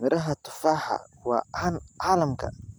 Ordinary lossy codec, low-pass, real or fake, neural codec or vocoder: none; none; real; none